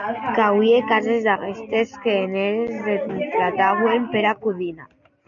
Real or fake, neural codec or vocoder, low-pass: real; none; 7.2 kHz